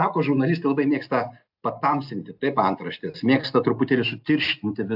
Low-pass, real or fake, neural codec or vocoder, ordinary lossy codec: 5.4 kHz; real; none; AAC, 48 kbps